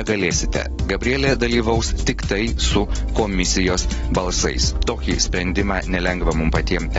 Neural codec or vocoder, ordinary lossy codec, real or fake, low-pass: none; AAC, 24 kbps; real; 19.8 kHz